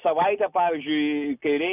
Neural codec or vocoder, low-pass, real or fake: none; 3.6 kHz; real